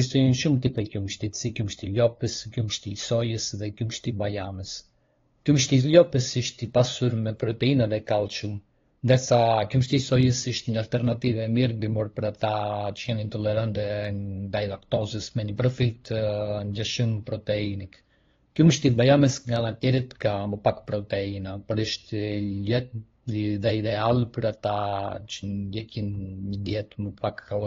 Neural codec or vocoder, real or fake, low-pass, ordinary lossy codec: codec, 16 kHz, 2 kbps, FunCodec, trained on LibriTTS, 25 frames a second; fake; 7.2 kHz; AAC, 32 kbps